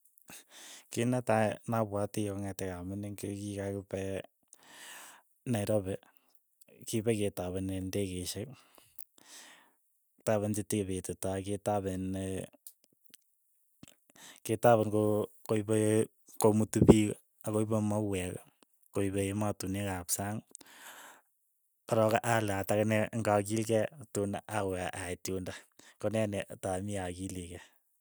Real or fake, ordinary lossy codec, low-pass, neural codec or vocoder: real; none; none; none